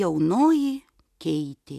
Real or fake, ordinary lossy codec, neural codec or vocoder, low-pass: real; MP3, 96 kbps; none; 14.4 kHz